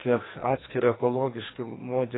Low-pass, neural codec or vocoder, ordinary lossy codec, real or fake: 7.2 kHz; codec, 44.1 kHz, 2.6 kbps, SNAC; AAC, 16 kbps; fake